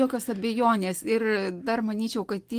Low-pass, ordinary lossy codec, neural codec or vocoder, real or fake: 14.4 kHz; Opus, 24 kbps; none; real